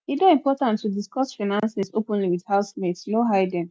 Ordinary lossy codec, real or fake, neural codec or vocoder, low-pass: none; real; none; none